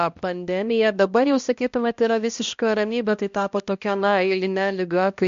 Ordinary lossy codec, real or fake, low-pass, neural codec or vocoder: MP3, 48 kbps; fake; 7.2 kHz; codec, 16 kHz, 1 kbps, X-Codec, HuBERT features, trained on balanced general audio